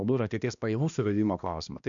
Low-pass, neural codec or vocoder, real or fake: 7.2 kHz; codec, 16 kHz, 1 kbps, X-Codec, HuBERT features, trained on balanced general audio; fake